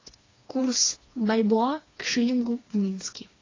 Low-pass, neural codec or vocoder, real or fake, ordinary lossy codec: 7.2 kHz; codec, 16 kHz, 2 kbps, FreqCodec, smaller model; fake; AAC, 32 kbps